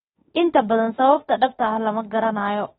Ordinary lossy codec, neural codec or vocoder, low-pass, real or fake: AAC, 16 kbps; autoencoder, 48 kHz, 32 numbers a frame, DAC-VAE, trained on Japanese speech; 19.8 kHz; fake